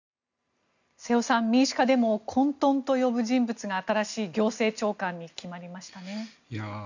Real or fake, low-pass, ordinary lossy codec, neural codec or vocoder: real; 7.2 kHz; none; none